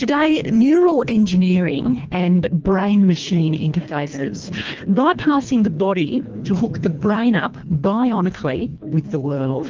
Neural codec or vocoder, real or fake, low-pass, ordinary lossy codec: codec, 24 kHz, 1.5 kbps, HILCodec; fake; 7.2 kHz; Opus, 24 kbps